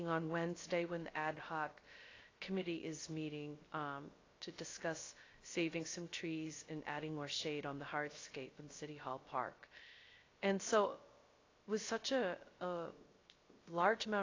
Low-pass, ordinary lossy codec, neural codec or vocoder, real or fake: 7.2 kHz; AAC, 32 kbps; codec, 16 kHz, 0.3 kbps, FocalCodec; fake